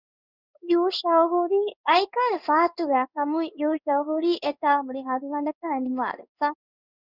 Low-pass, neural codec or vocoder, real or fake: 5.4 kHz; codec, 16 kHz in and 24 kHz out, 1 kbps, XY-Tokenizer; fake